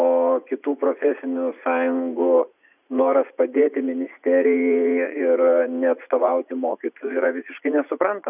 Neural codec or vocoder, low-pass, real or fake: vocoder, 44.1 kHz, 80 mel bands, Vocos; 3.6 kHz; fake